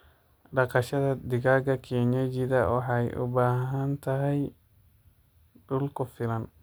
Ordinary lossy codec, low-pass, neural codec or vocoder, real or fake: none; none; none; real